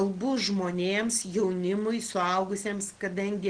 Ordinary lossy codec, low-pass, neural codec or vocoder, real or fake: Opus, 16 kbps; 9.9 kHz; none; real